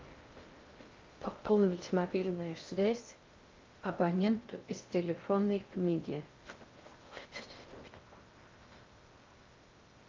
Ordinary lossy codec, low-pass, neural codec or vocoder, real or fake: Opus, 24 kbps; 7.2 kHz; codec, 16 kHz in and 24 kHz out, 0.6 kbps, FocalCodec, streaming, 2048 codes; fake